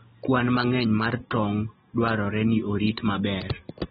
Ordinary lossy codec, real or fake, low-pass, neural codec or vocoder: AAC, 16 kbps; real; 19.8 kHz; none